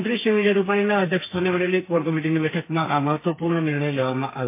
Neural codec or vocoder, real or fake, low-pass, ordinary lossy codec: codec, 32 kHz, 1.9 kbps, SNAC; fake; 3.6 kHz; MP3, 24 kbps